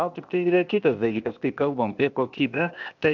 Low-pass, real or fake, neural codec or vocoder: 7.2 kHz; fake; codec, 16 kHz, 0.8 kbps, ZipCodec